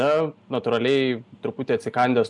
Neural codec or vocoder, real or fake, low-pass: none; real; 10.8 kHz